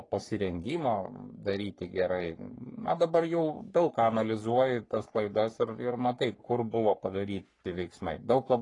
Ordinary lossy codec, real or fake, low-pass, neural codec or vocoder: AAC, 32 kbps; fake; 10.8 kHz; codec, 44.1 kHz, 3.4 kbps, Pupu-Codec